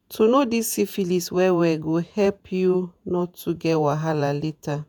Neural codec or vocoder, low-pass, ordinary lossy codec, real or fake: vocoder, 48 kHz, 128 mel bands, Vocos; none; none; fake